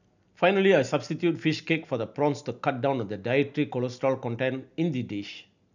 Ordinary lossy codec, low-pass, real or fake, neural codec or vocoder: none; 7.2 kHz; real; none